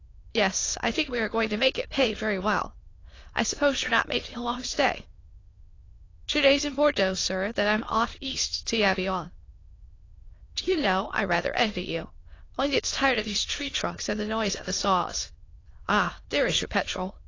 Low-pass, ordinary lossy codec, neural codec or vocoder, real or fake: 7.2 kHz; AAC, 32 kbps; autoencoder, 22.05 kHz, a latent of 192 numbers a frame, VITS, trained on many speakers; fake